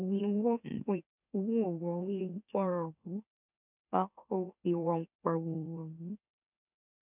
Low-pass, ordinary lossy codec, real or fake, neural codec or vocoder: 3.6 kHz; none; fake; autoencoder, 44.1 kHz, a latent of 192 numbers a frame, MeloTTS